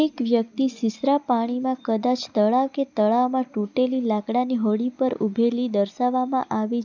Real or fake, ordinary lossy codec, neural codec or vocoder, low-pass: real; none; none; 7.2 kHz